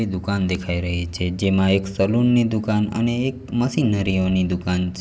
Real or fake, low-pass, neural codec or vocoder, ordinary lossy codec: real; none; none; none